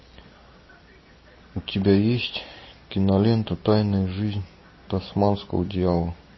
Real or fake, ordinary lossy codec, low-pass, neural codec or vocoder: real; MP3, 24 kbps; 7.2 kHz; none